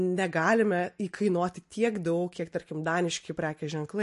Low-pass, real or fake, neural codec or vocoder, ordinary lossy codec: 14.4 kHz; real; none; MP3, 48 kbps